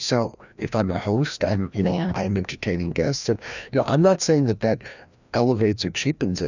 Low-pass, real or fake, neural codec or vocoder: 7.2 kHz; fake; codec, 16 kHz, 1 kbps, FreqCodec, larger model